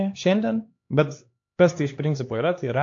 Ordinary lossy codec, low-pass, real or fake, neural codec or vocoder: AAC, 48 kbps; 7.2 kHz; fake; codec, 16 kHz, 2 kbps, X-Codec, HuBERT features, trained on LibriSpeech